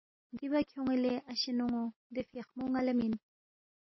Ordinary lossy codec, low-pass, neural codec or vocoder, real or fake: MP3, 24 kbps; 7.2 kHz; none; real